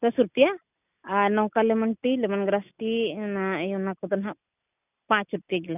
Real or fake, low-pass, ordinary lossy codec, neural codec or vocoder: real; 3.6 kHz; none; none